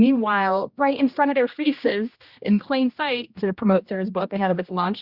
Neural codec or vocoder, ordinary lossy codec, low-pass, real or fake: codec, 16 kHz, 1 kbps, X-Codec, HuBERT features, trained on general audio; Opus, 64 kbps; 5.4 kHz; fake